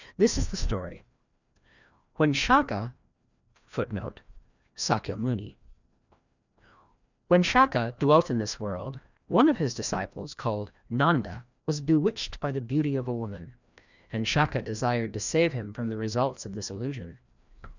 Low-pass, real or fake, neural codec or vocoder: 7.2 kHz; fake; codec, 16 kHz, 1 kbps, FreqCodec, larger model